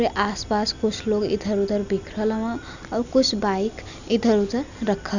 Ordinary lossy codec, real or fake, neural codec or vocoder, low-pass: none; real; none; 7.2 kHz